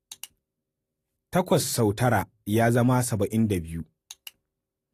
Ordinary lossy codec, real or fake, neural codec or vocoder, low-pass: AAC, 48 kbps; real; none; 14.4 kHz